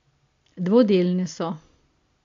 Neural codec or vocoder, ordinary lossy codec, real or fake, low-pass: none; AAC, 48 kbps; real; 7.2 kHz